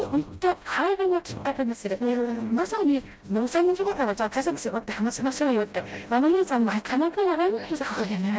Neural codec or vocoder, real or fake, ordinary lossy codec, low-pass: codec, 16 kHz, 0.5 kbps, FreqCodec, smaller model; fake; none; none